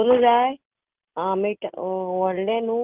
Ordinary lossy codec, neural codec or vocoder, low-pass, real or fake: Opus, 32 kbps; none; 3.6 kHz; real